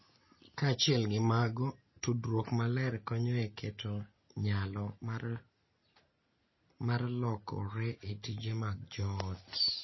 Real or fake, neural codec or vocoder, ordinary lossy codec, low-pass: real; none; MP3, 24 kbps; 7.2 kHz